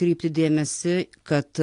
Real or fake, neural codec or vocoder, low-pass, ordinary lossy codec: real; none; 10.8 kHz; AAC, 48 kbps